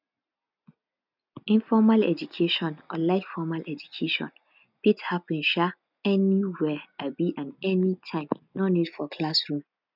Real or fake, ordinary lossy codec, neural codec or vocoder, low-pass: real; none; none; 5.4 kHz